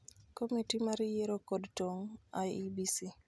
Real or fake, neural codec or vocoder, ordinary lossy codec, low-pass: real; none; none; none